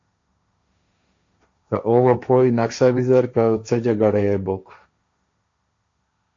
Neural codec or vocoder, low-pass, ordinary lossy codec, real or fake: codec, 16 kHz, 1.1 kbps, Voila-Tokenizer; 7.2 kHz; AAC, 48 kbps; fake